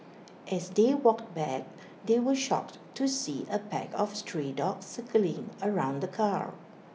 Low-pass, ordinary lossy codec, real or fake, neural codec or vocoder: none; none; real; none